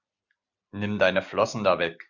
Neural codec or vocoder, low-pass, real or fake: none; 7.2 kHz; real